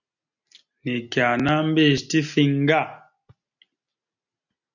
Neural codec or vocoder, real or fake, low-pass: none; real; 7.2 kHz